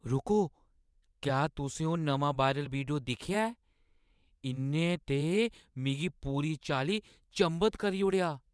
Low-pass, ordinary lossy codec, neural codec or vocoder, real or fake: none; none; vocoder, 22.05 kHz, 80 mel bands, WaveNeXt; fake